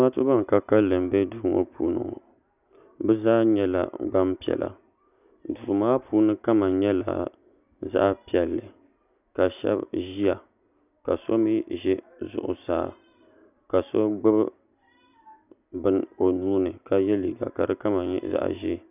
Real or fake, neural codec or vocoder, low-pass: real; none; 3.6 kHz